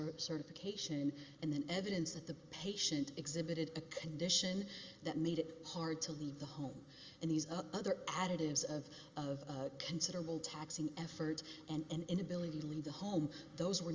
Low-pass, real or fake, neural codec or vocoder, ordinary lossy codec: 7.2 kHz; real; none; Opus, 32 kbps